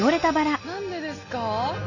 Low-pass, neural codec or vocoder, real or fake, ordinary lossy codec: 7.2 kHz; none; real; none